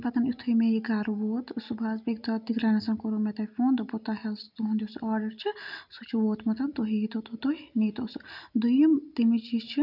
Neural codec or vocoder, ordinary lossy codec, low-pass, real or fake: none; none; 5.4 kHz; real